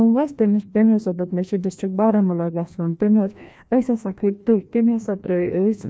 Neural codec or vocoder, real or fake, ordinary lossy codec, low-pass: codec, 16 kHz, 1 kbps, FreqCodec, larger model; fake; none; none